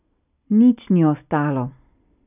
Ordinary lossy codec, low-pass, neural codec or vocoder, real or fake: none; 3.6 kHz; none; real